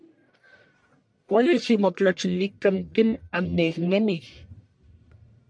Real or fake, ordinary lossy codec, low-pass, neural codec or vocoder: fake; MP3, 64 kbps; 9.9 kHz; codec, 44.1 kHz, 1.7 kbps, Pupu-Codec